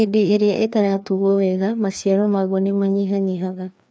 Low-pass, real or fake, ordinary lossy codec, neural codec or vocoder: none; fake; none; codec, 16 kHz, 2 kbps, FreqCodec, larger model